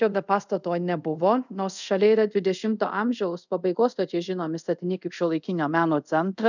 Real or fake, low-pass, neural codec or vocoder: fake; 7.2 kHz; codec, 24 kHz, 0.5 kbps, DualCodec